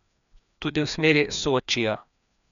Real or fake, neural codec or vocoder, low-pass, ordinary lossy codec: fake; codec, 16 kHz, 2 kbps, FreqCodec, larger model; 7.2 kHz; none